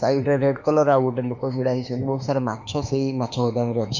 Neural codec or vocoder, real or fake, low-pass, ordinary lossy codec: autoencoder, 48 kHz, 32 numbers a frame, DAC-VAE, trained on Japanese speech; fake; 7.2 kHz; none